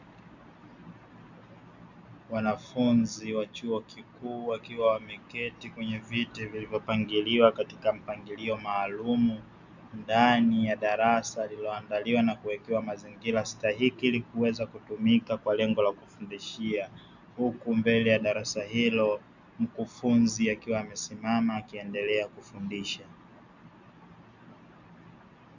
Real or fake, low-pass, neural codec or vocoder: real; 7.2 kHz; none